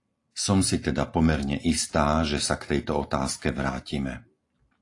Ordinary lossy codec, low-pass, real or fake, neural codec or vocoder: AAC, 48 kbps; 10.8 kHz; real; none